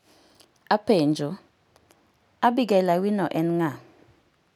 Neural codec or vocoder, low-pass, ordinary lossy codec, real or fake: none; 19.8 kHz; none; real